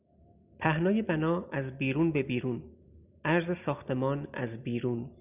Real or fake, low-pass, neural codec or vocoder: real; 3.6 kHz; none